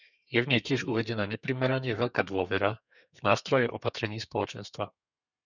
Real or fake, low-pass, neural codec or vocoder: fake; 7.2 kHz; codec, 32 kHz, 1.9 kbps, SNAC